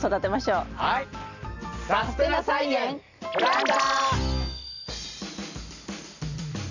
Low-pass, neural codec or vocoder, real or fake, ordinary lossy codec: 7.2 kHz; none; real; none